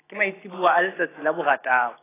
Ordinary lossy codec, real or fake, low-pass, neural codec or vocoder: AAC, 16 kbps; real; 3.6 kHz; none